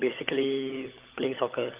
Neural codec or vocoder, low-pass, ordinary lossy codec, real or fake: codec, 16 kHz, 16 kbps, FunCodec, trained on LibriTTS, 50 frames a second; 3.6 kHz; Opus, 32 kbps; fake